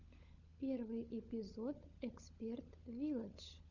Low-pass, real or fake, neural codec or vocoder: 7.2 kHz; fake; codec, 16 kHz, 16 kbps, FunCodec, trained on LibriTTS, 50 frames a second